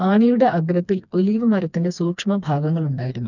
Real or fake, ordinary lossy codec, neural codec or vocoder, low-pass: fake; none; codec, 16 kHz, 2 kbps, FreqCodec, smaller model; 7.2 kHz